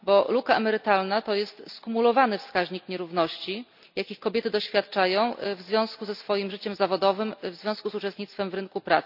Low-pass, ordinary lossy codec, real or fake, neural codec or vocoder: 5.4 kHz; none; real; none